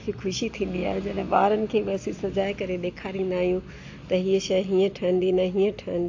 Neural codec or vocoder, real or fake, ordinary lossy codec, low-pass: none; real; AAC, 48 kbps; 7.2 kHz